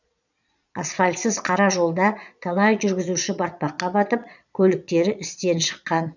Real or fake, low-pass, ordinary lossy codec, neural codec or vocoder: fake; 7.2 kHz; none; vocoder, 22.05 kHz, 80 mel bands, Vocos